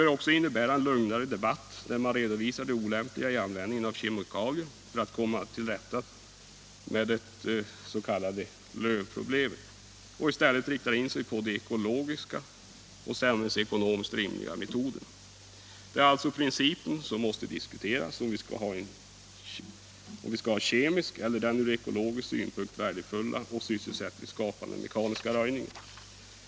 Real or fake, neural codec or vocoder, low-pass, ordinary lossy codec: real; none; none; none